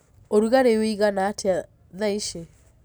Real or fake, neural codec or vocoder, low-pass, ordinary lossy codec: real; none; none; none